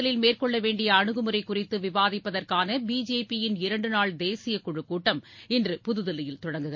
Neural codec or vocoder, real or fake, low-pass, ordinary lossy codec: none; real; 7.2 kHz; MP3, 32 kbps